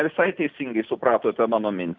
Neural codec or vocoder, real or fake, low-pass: none; real; 7.2 kHz